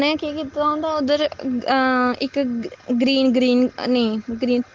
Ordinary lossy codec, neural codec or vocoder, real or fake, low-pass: Opus, 16 kbps; none; real; 7.2 kHz